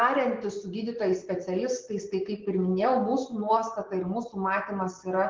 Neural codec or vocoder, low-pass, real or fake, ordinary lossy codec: none; 7.2 kHz; real; Opus, 16 kbps